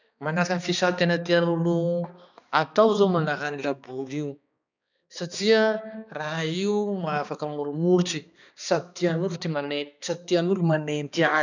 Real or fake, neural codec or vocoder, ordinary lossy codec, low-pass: fake; codec, 16 kHz, 2 kbps, X-Codec, HuBERT features, trained on balanced general audio; none; 7.2 kHz